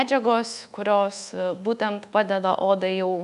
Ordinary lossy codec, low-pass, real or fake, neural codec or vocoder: AAC, 96 kbps; 10.8 kHz; fake; codec, 24 kHz, 1.2 kbps, DualCodec